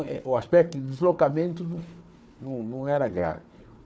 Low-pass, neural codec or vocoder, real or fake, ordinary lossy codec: none; codec, 16 kHz, 4 kbps, FunCodec, trained on Chinese and English, 50 frames a second; fake; none